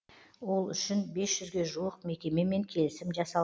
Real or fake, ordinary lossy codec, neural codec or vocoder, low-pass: real; none; none; none